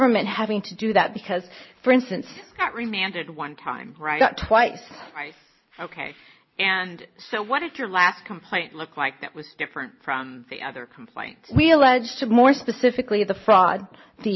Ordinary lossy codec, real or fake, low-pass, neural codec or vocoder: MP3, 24 kbps; real; 7.2 kHz; none